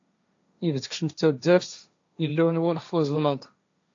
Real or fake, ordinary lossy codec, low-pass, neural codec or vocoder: fake; AAC, 48 kbps; 7.2 kHz; codec, 16 kHz, 1.1 kbps, Voila-Tokenizer